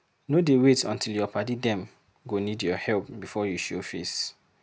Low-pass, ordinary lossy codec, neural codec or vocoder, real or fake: none; none; none; real